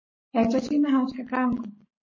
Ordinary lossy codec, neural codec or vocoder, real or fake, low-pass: MP3, 32 kbps; codec, 44.1 kHz, 7.8 kbps, Pupu-Codec; fake; 7.2 kHz